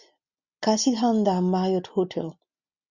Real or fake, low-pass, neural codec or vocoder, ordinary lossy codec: real; 7.2 kHz; none; Opus, 64 kbps